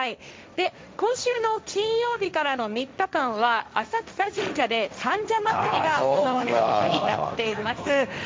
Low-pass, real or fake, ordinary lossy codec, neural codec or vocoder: none; fake; none; codec, 16 kHz, 1.1 kbps, Voila-Tokenizer